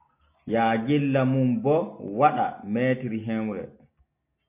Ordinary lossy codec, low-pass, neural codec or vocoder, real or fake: MP3, 24 kbps; 3.6 kHz; none; real